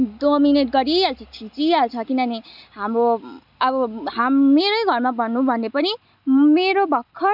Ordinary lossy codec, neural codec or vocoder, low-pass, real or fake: none; none; 5.4 kHz; real